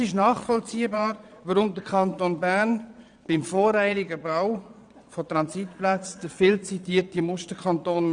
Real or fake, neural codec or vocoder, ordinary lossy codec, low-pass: fake; vocoder, 22.05 kHz, 80 mel bands, Vocos; none; 9.9 kHz